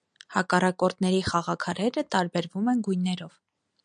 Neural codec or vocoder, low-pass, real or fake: none; 9.9 kHz; real